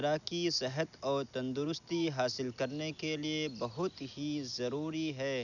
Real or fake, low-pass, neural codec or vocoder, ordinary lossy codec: real; 7.2 kHz; none; none